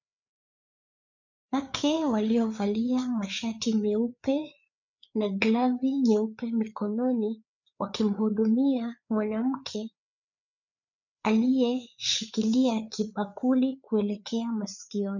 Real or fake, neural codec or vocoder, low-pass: fake; codec, 16 kHz, 4 kbps, FreqCodec, larger model; 7.2 kHz